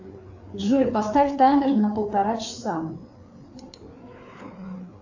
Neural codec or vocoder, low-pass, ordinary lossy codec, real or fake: codec, 16 kHz, 4 kbps, FreqCodec, larger model; 7.2 kHz; AAC, 48 kbps; fake